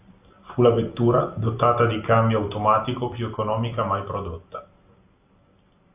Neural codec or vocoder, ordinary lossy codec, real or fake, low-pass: none; AAC, 32 kbps; real; 3.6 kHz